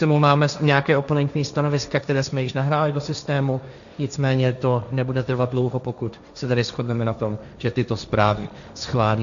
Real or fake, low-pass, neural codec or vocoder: fake; 7.2 kHz; codec, 16 kHz, 1.1 kbps, Voila-Tokenizer